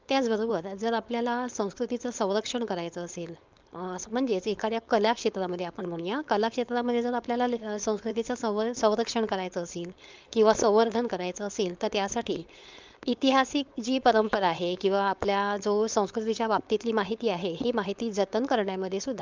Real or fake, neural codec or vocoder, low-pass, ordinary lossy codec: fake; codec, 16 kHz, 4.8 kbps, FACodec; 7.2 kHz; Opus, 24 kbps